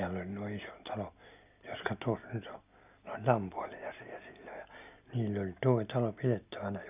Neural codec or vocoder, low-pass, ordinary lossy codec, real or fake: none; 3.6 kHz; none; real